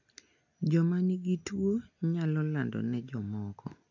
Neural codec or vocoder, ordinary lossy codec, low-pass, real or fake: none; AAC, 48 kbps; 7.2 kHz; real